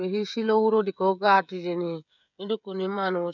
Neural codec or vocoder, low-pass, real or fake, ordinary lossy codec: codec, 16 kHz, 16 kbps, FreqCodec, smaller model; 7.2 kHz; fake; none